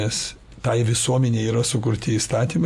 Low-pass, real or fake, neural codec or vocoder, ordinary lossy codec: 14.4 kHz; real; none; MP3, 96 kbps